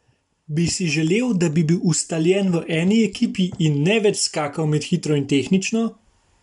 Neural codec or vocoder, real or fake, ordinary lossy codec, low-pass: none; real; none; 10.8 kHz